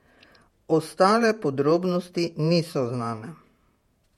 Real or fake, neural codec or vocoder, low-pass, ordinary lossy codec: fake; vocoder, 48 kHz, 128 mel bands, Vocos; 19.8 kHz; MP3, 64 kbps